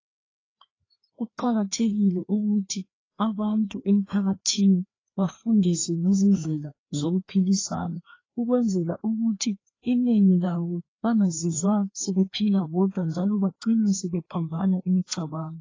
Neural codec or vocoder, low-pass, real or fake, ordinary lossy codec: codec, 16 kHz, 2 kbps, FreqCodec, larger model; 7.2 kHz; fake; AAC, 32 kbps